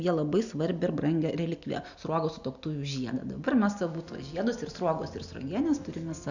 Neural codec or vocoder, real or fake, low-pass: none; real; 7.2 kHz